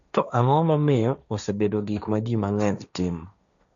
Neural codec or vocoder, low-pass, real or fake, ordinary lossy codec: codec, 16 kHz, 1.1 kbps, Voila-Tokenizer; 7.2 kHz; fake; none